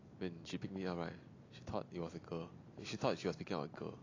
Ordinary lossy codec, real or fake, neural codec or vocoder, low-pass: AAC, 32 kbps; real; none; 7.2 kHz